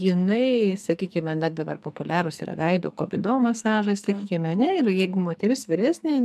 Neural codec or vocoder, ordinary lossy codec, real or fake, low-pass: codec, 44.1 kHz, 2.6 kbps, SNAC; MP3, 96 kbps; fake; 14.4 kHz